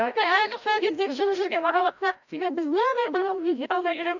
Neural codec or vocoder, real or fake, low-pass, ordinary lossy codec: codec, 16 kHz, 0.5 kbps, FreqCodec, larger model; fake; 7.2 kHz; none